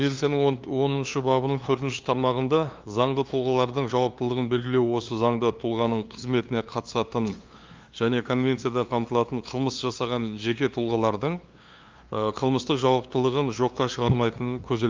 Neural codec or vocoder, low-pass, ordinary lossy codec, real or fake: codec, 16 kHz, 2 kbps, FunCodec, trained on LibriTTS, 25 frames a second; 7.2 kHz; Opus, 32 kbps; fake